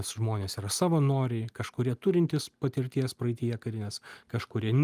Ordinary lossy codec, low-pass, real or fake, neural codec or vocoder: Opus, 32 kbps; 14.4 kHz; real; none